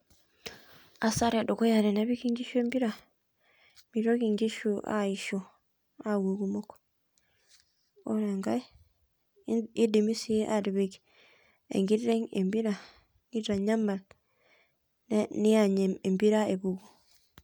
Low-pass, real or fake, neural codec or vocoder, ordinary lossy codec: none; real; none; none